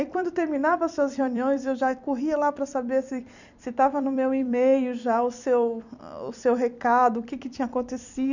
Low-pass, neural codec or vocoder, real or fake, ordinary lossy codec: 7.2 kHz; vocoder, 44.1 kHz, 128 mel bands every 256 samples, BigVGAN v2; fake; none